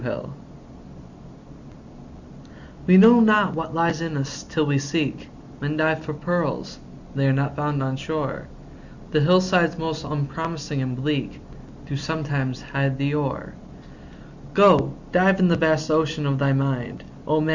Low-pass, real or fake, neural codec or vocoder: 7.2 kHz; real; none